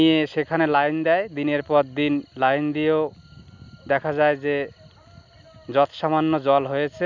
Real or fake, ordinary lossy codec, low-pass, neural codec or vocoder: real; none; 7.2 kHz; none